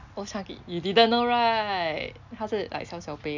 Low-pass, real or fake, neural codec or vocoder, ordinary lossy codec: 7.2 kHz; real; none; none